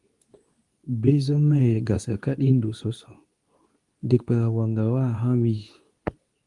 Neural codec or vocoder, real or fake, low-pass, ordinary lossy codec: codec, 24 kHz, 0.9 kbps, WavTokenizer, medium speech release version 2; fake; 10.8 kHz; Opus, 32 kbps